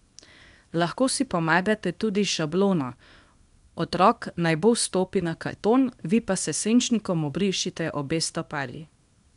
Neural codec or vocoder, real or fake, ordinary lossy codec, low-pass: codec, 24 kHz, 0.9 kbps, WavTokenizer, small release; fake; none; 10.8 kHz